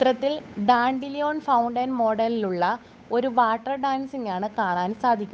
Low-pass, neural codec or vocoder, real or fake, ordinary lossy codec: none; codec, 16 kHz, 8 kbps, FunCodec, trained on Chinese and English, 25 frames a second; fake; none